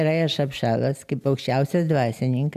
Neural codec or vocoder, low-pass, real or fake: none; 14.4 kHz; real